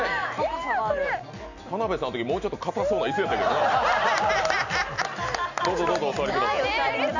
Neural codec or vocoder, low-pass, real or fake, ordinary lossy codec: none; 7.2 kHz; real; none